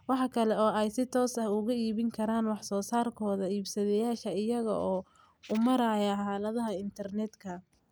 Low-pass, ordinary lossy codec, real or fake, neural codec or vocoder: none; none; real; none